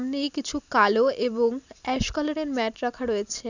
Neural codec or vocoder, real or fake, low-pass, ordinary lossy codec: none; real; 7.2 kHz; none